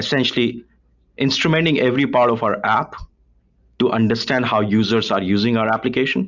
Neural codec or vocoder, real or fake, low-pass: none; real; 7.2 kHz